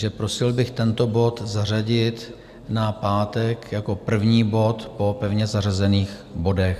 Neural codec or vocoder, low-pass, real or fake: none; 14.4 kHz; real